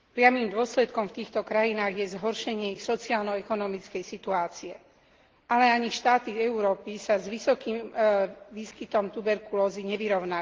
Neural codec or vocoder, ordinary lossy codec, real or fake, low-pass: none; Opus, 16 kbps; real; 7.2 kHz